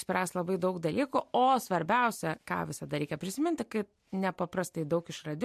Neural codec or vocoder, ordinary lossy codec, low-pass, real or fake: none; MP3, 64 kbps; 14.4 kHz; real